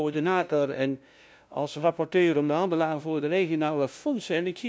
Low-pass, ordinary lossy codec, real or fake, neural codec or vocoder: none; none; fake; codec, 16 kHz, 0.5 kbps, FunCodec, trained on LibriTTS, 25 frames a second